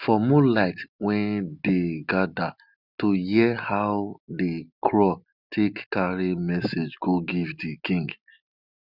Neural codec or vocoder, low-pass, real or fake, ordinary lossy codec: none; 5.4 kHz; real; none